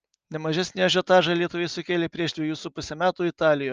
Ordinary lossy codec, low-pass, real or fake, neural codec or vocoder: Opus, 24 kbps; 7.2 kHz; real; none